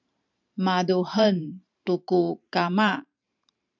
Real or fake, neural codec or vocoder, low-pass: fake; vocoder, 44.1 kHz, 128 mel bands every 512 samples, BigVGAN v2; 7.2 kHz